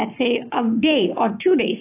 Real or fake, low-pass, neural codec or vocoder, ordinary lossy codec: fake; 3.6 kHz; codec, 44.1 kHz, 7.8 kbps, Pupu-Codec; AAC, 24 kbps